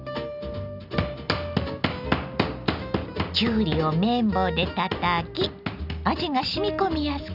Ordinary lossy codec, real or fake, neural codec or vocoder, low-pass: none; real; none; 5.4 kHz